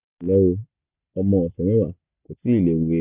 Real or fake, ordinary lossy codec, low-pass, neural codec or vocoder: real; none; 3.6 kHz; none